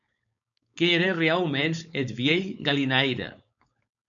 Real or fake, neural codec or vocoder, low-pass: fake; codec, 16 kHz, 4.8 kbps, FACodec; 7.2 kHz